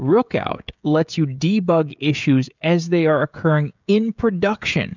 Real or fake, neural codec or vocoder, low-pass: fake; codec, 16 kHz, 16 kbps, FreqCodec, smaller model; 7.2 kHz